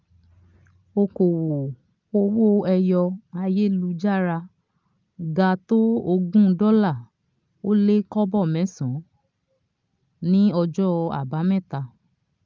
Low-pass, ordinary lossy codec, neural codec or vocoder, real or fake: 7.2 kHz; Opus, 24 kbps; none; real